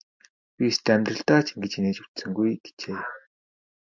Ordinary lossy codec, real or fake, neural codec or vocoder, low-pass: MP3, 64 kbps; real; none; 7.2 kHz